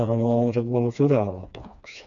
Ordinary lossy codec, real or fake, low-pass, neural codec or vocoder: none; fake; 7.2 kHz; codec, 16 kHz, 2 kbps, FreqCodec, smaller model